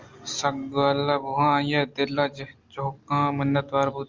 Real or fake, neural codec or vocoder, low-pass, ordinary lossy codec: real; none; 7.2 kHz; Opus, 32 kbps